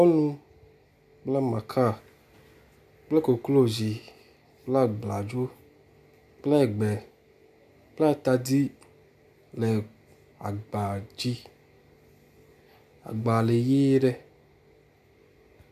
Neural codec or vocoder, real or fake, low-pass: none; real; 14.4 kHz